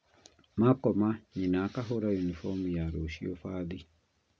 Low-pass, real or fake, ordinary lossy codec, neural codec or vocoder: none; real; none; none